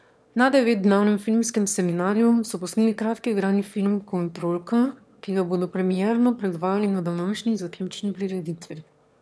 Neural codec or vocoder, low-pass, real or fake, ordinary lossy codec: autoencoder, 22.05 kHz, a latent of 192 numbers a frame, VITS, trained on one speaker; none; fake; none